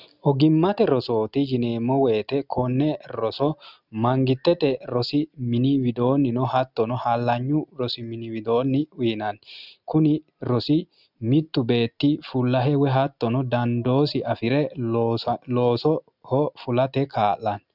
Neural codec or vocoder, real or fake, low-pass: none; real; 5.4 kHz